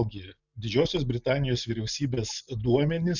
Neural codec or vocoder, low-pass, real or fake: none; 7.2 kHz; real